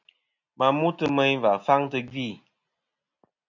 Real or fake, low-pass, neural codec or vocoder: real; 7.2 kHz; none